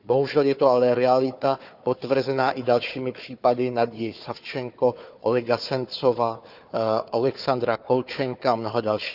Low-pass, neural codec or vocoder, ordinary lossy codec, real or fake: 5.4 kHz; codec, 16 kHz, 4 kbps, FunCodec, trained on Chinese and English, 50 frames a second; none; fake